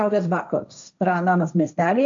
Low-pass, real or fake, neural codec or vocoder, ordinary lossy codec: 7.2 kHz; fake; codec, 16 kHz, 1.1 kbps, Voila-Tokenizer; AAC, 64 kbps